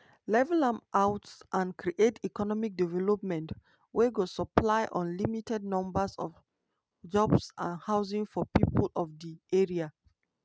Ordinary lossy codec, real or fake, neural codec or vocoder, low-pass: none; real; none; none